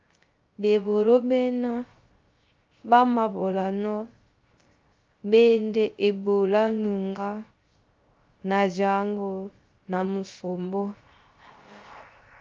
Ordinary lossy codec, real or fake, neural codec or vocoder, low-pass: Opus, 24 kbps; fake; codec, 16 kHz, 0.3 kbps, FocalCodec; 7.2 kHz